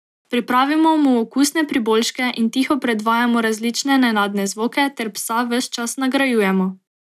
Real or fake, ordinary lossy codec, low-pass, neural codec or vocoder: real; none; 14.4 kHz; none